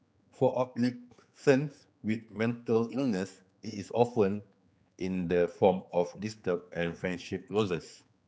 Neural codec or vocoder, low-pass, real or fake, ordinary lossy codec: codec, 16 kHz, 4 kbps, X-Codec, HuBERT features, trained on general audio; none; fake; none